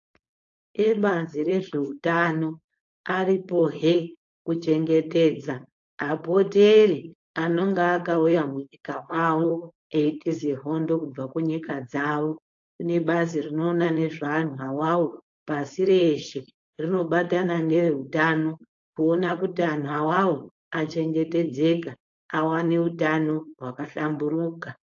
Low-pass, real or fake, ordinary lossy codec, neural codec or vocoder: 7.2 kHz; fake; AAC, 48 kbps; codec, 16 kHz, 4.8 kbps, FACodec